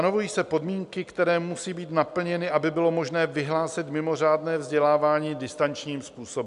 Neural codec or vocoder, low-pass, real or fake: none; 10.8 kHz; real